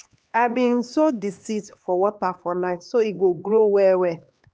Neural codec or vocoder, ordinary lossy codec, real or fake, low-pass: codec, 16 kHz, 2 kbps, X-Codec, HuBERT features, trained on LibriSpeech; none; fake; none